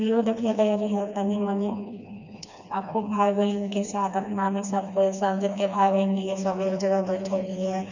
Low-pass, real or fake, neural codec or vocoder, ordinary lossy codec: 7.2 kHz; fake; codec, 16 kHz, 2 kbps, FreqCodec, smaller model; none